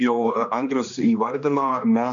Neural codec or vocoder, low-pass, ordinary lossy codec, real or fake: codec, 16 kHz, 2 kbps, X-Codec, HuBERT features, trained on general audio; 7.2 kHz; AAC, 64 kbps; fake